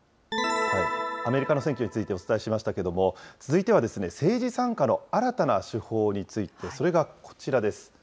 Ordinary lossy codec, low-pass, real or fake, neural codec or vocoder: none; none; real; none